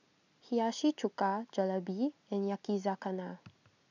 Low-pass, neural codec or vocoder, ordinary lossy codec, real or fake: 7.2 kHz; none; none; real